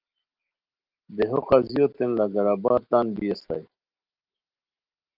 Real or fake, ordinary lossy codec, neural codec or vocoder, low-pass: real; Opus, 24 kbps; none; 5.4 kHz